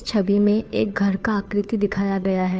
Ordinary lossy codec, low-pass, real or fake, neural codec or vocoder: none; none; fake; codec, 16 kHz, 2 kbps, FunCodec, trained on Chinese and English, 25 frames a second